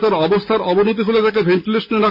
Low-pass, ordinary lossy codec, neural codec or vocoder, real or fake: 5.4 kHz; none; none; real